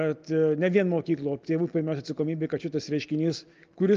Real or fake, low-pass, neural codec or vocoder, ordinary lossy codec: real; 7.2 kHz; none; Opus, 24 kbps